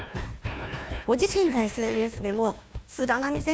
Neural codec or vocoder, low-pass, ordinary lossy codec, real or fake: codec, 16 kHz, 1 kbps, FunCodec, trained on Chinese and English, 50 frames a second; none; none; fake